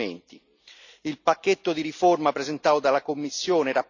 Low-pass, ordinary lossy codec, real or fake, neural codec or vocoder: 7.2 kHz; none; real; none